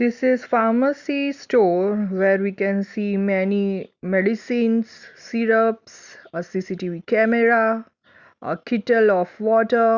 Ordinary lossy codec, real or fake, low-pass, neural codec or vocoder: Opus, 64 kbps; real; 7.2 kHz; none